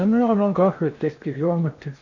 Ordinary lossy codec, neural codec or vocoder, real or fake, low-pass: none; codec, 16 kHz in and 24 kHz out, 0.8 kbps, FocalCodec, streaming, 65536 codes; fake; 7.2 kHz